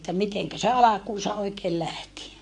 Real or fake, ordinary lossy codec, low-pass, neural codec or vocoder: fake; AAC, 64 kbps; 10.8 kHz; codec, 44.1 kHz, 7.8 kbps, Pupu-Codec